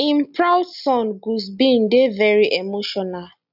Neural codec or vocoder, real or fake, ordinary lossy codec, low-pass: none; real; none; 5.4 kHz